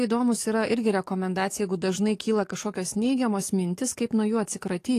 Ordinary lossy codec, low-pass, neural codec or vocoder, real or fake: AAC, 48 kbps; 14.4 kHz; codec, 44.1 kHz, 7.8 kbps, DAC; fake